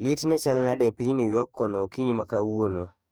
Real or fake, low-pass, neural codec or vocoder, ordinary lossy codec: fake; none; codec, 44.1 kHz, 2.6 kbps, DAC; none